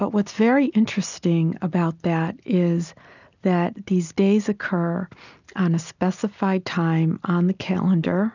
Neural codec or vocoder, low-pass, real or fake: none; 7.2 kHz; real